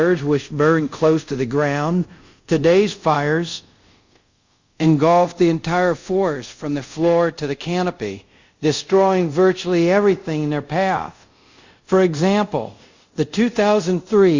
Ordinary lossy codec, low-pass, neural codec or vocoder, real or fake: Opus, 64 kbps; 7.2 kHz; codec, 24 kHz, 0.5 kbps, DualCodec; fake